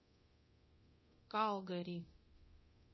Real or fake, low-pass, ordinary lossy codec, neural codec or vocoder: fake; 7.2 kHz; MP3, 24 kbps; codec, 24 kHz, 0.9 kbps, DualCodec